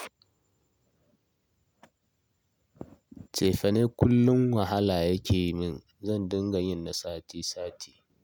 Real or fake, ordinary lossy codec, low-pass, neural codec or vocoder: real; none; none; none